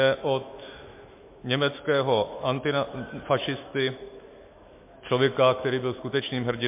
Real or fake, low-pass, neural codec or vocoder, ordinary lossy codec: fake; 3.6 kHz; autoencoder, 48 kHz, 128 numbers a frame, DAC-VAE, trained on Japanese speech; MP3, 24 kbps